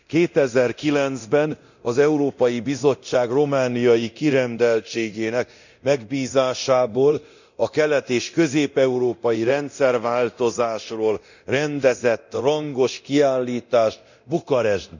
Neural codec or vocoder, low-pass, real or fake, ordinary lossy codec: codec, 24 kHz, 0.9 kbps, DualCodec; 7.2 kHz; fake; none